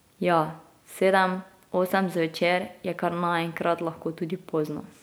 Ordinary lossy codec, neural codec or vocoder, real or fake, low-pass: none; none; real; none